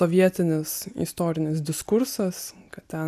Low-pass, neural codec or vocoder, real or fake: 14.4 kHz; none; real